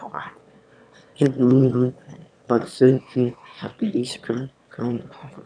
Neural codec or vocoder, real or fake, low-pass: autoencoder, 22.05 kHz, a latent of 192 numbers a frame, VITS, trained on one speaker; fake; 9.9 kHz